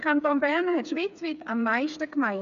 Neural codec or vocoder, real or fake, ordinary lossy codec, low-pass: codec, 16 kHz, 4 kbps, FreqCodec, smaller model; fake; none; 7.2 kHz